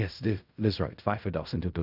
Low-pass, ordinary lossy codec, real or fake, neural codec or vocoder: 5.4 kHz; none; fake; codec, 16 kHz in and 24 kHz out, 0.4 kbps, LongCat-Audio-Codec, fine tuned four codebook decoder